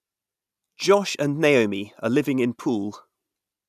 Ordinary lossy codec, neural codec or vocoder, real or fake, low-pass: none; none; real; 14.4 kHz